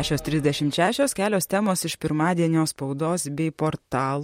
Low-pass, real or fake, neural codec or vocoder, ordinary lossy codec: 19.8 kHz; fake; vocoder, 44.1 kHz, 128 mel bands, Pupu-Vocoder; MP3, 64 kbps